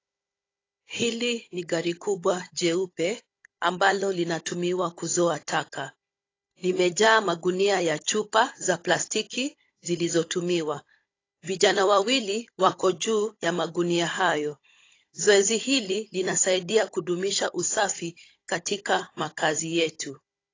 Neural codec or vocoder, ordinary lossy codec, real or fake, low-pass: codec, 16 kHz, 16 kbps, FunCodec, trained on Chinese and English, 50 frames a second; AAC, 32 kbps; fake; 7.2 kHz